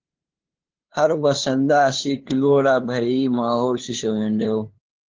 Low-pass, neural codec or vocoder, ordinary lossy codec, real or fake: 7.2 kHz; codec, 16 kHz, 2 kbps, FunCodec, trained on LibriTTS, 25 frames a second; Opus, 16 kbps; fake